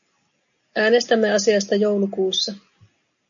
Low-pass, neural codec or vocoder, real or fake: 7.2 kHz; none; real